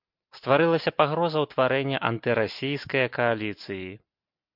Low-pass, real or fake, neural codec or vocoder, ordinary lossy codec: 5.4 kHz; real; none; MP3, 48 kbps